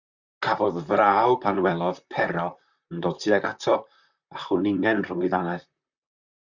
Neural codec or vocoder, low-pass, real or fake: vocoder, 44.1 kHz, 128 mel bands, Pupu-Vocoder; 7.2 kHz; fake